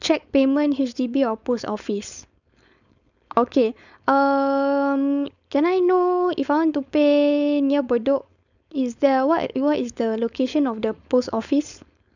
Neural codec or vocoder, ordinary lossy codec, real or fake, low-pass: codec, 16 kHz, 4.8 kbps, FACodec; none; fake; 7.2 kHz